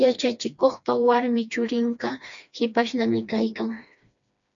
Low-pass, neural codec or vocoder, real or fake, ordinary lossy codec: 7.2 kHz; codec, 16 kHz, 2 kbps, FreqCodec, smaller model; fake; MP3, 64 kbps